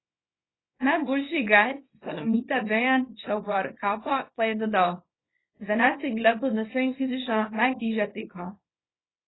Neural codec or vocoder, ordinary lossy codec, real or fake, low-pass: codec, 24 kHz, 0.9 kbps, WavTokenizer, small release; AAC, 16 kbps; fake; 7.2 kHz